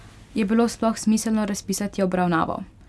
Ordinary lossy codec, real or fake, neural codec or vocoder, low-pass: none; real; none; none